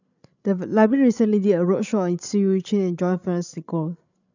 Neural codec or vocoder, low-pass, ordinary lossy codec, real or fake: codec, 16 kHz, 16 kbps, FreqCodec, larger model; 7.2 kHz; none; fake